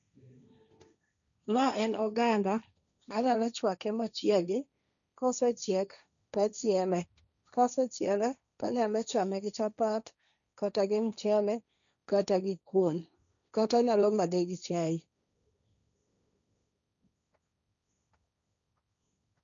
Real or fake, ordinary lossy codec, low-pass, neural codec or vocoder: fake; none; 7.2 kHz; codec, 16 kHz, 1.1 kbps, Voila-Tokenizer